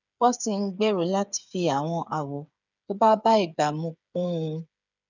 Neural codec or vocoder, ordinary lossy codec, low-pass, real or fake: codec, 16 kHz, 8 kbps, FreqCodec, smaller model; none; 7.2 kHz; fake